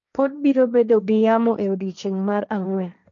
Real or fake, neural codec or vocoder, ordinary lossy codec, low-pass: fake; codec, 16 kHz, 1.1 kbps, Voila-Tokenizer; none; 7.2 kHz